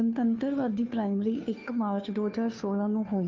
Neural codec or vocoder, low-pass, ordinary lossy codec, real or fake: codec, 16 kHz, 2 kbps, FunCodec, trained on Chinese and English, 25 frames a second; 7.2 kHz; Opus, 24 kbps; fake